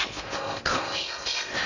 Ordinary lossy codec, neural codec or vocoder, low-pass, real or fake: none; codec, 16 kHz in and 24 kHz out, 0.6 kbps, FocalCodec, streaming, 2048 codes; 7.2 kHz; fake